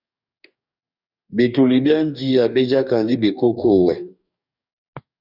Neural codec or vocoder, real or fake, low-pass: codec, 44.1 kHz, 2.6 kbps, DAC; fake; 5.4 kHz